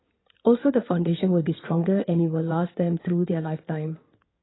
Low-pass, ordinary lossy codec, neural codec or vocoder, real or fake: 7.2 kHz; AAC, 16 kbps; codec, 16 kHz in and 24 kHz out, 2.2 kbps, FireRedTTS-2 codec; fake